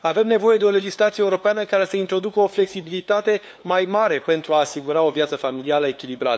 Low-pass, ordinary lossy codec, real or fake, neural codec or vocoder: none; none; fake; codec, 16 kHz, 2 kbps, FunCodec, trained on LibriTTS, 25 frames a second